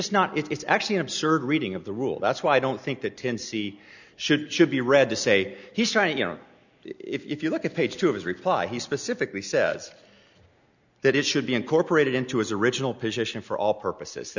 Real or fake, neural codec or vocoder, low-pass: real; none; 7.2 kHz